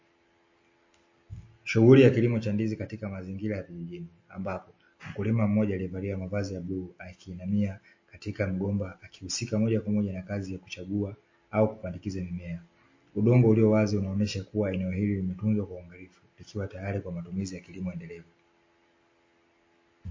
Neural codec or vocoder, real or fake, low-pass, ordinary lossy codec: none; real; 7.2 kHz; MP3, 32 kbps